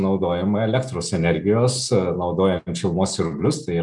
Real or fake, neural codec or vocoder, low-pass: real; none; 10.8 kHz